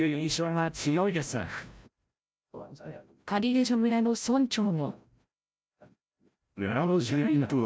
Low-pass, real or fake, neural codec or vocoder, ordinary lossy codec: none; fake; codec, 16 kHz, 0.5 kbps, FreqCodec, larger model; none